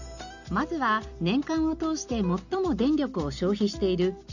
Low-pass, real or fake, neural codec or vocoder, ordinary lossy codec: 7.2 kHz; real; none; none